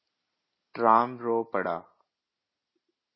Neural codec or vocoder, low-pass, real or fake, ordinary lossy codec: none; 7.2 kHz; real; MP3, 24 kbps